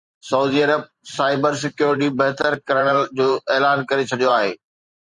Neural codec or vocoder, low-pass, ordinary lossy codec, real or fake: vocoder, 44.1 kHz, 128 mel bands every 512 samples, BigVGAN v2; 10.8 kHz; Opus, 64 kbps; fake